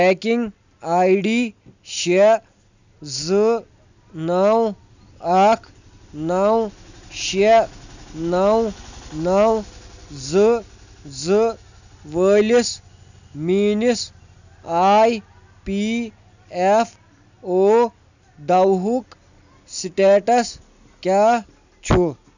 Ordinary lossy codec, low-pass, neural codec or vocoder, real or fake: none; 7.2 kHz; none; real